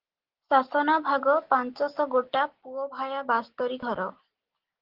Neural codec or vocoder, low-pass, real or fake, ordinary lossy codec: none; 5.4 kHz; real; Opus, 16 kbps